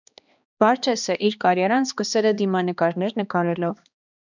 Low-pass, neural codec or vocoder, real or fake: 7.2 kHz; codec, 16 kHz, 2 kbps, X-Codec, HuBERT features, trained on balanced general audio; fake